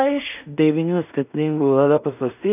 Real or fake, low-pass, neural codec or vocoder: fake; 3.6 kHz; codec, 16 kHz in and 24 kHz out, 0.4 kbps, LongCat-Audio-Codec, two codebook decoder